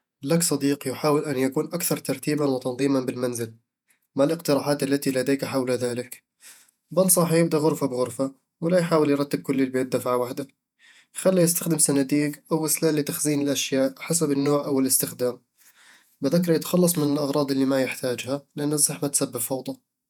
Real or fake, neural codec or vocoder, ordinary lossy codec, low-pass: fake; vocoder, 48 kHz, 128 mel bands, Vocos; none; 19.8 kHz